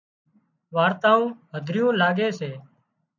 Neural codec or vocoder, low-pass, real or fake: none; 7.2 kHz; real